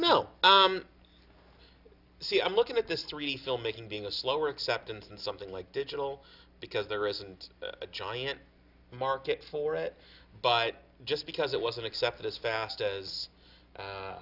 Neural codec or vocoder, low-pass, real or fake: none; 5.4 kHz; real